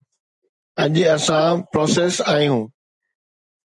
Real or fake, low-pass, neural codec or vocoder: real; 10.8 kHz; none